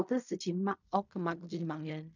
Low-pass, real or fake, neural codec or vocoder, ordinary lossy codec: 7.2 kHz; fake; codec, 16 kHz in and 24 kHz out, 0.4 kbps, LongCat-Audio-Codec, fine tuned four codebook decoder; none